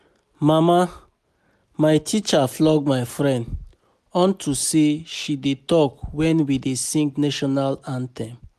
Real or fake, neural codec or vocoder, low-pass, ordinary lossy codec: real; none; 14.4 kHz; none